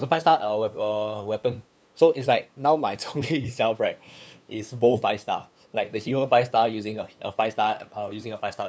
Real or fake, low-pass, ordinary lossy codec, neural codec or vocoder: fake; none; none; codec, 16 kHz, 2 kbps, FunCodec, trained on LibriTTS, 25 frames a second